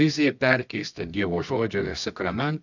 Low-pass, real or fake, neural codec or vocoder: 7.2 kHz; fake; codec, 24 kHz, 0.9 kbps, WavTokenizer, medium music audio release